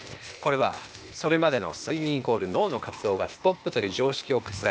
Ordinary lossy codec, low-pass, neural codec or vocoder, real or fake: none; none; codec, 16 kHz, 0.8 kbps, ZipCodec; fake